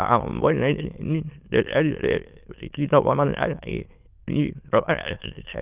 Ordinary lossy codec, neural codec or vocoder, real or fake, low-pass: Opus, 24 kbps; autoencoder, 22.05 kHz, a latent of 192 numbers a frame, VITS, trained on many speakers; fake; 3.6 kHz